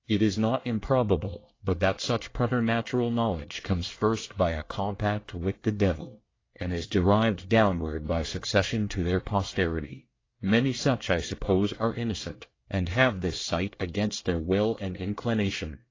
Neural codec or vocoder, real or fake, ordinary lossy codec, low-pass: codec, 24 kHz, 1 kbps, SNAC; fake; AAC, 32 kbps; 7.2 kHz